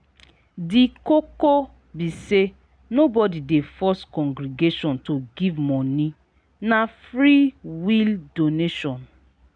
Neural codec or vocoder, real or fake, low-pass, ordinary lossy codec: none; real; 9.9 kHz; none